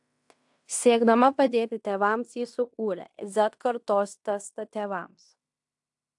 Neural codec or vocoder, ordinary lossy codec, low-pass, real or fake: codec, 16 kHz in and 24 kHz out, 0.9 kbps, LongCat-Audio-Codec, fine tuned four codebook decoder; AAC, 64 kbps; 10.8 kHz; fake